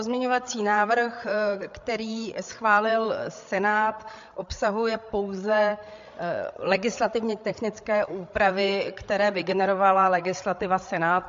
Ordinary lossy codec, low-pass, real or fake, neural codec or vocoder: MP3, 48 kbps; 7.2 kHz; fake; codec, 16 kHz, 8 kbps, FreqCodec, larger model